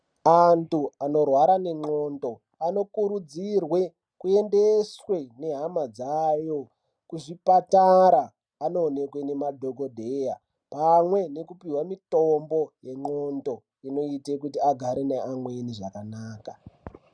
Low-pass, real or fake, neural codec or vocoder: 9.9 kHz; real; none